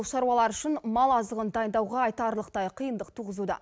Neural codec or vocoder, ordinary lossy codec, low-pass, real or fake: none; none; none; real